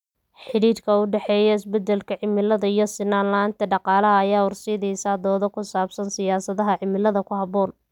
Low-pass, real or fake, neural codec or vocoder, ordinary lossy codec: 19.8 kHz; real; none; none